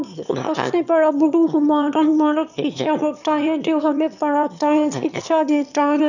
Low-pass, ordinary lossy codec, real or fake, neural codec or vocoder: 7.2 kHz; none; fake; autoencoder, 22.05 kHz, a latent of 192 numbers a frame, VITS, trained on one speaker